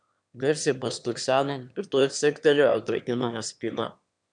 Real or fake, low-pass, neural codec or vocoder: fake; 9.9 kHz; autoencoder, 22.05 kHz, a latent of 192 numbers a frame, VITS, trained on one speaker